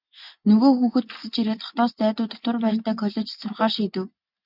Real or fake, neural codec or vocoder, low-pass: real; none; 5.4 kHz